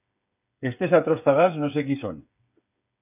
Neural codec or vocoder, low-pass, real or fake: codec, 16 kHz, 8 kbps, FreqCodec, smaller model; 3.6 kHz; fake